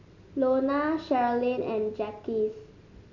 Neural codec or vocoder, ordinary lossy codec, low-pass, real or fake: none; none; 7.2 kHz; real